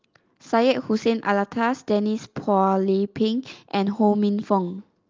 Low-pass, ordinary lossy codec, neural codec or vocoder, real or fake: 7.2 kHz; Opus, 24 kbps; none; real